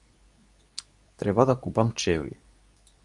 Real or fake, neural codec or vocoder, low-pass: fake; codec, 24 kHz, 0.9 kbps, WavTokenizer, medium speech release version 2; 10.8 kHz